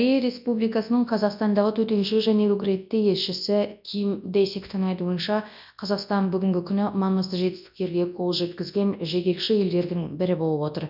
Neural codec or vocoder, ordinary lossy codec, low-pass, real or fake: codec, 24 kHz, 0.9 kbps, WavTokenizer, large speech release; none; 5.4 kHz; fake